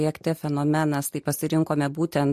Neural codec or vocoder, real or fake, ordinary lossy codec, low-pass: none; real; MP3, 64 kbps; 14.4 kHz